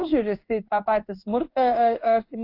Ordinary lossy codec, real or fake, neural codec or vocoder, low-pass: AAC, 32 kbps; fake; codec, 16 kHz in and 24 kHz out, 1 kbps, XY-Tokenizer; 5.4 kHz